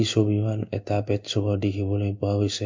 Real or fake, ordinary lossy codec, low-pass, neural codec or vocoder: fake; MP3, 64 kbps; 7.2 kHz; codec, 16 kHz in and 24 kHz out, 1 kbps, XY-Tokenizer